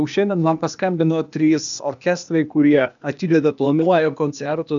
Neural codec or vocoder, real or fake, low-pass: codec, 16 kHz, 0.8 kbps, ZipCodec; fake; 7.2 kHz